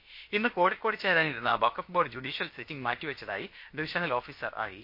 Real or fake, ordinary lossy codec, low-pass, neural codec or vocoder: fake; MP3, 32 kbps; 5.4 kHz; codec, 16 kHz, about 1 kbps, DyCAST, with the encoder's durations